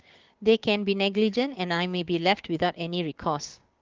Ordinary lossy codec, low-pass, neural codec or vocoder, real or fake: Opus, 16 kbps; 7.2 kHz; codec, 16 kHz, 6 kbps, DAC; fake